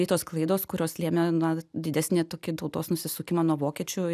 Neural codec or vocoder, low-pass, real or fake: none; 14.4 kHz; real